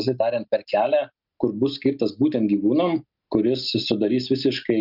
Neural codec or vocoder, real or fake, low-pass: none; real; 5.4 kHz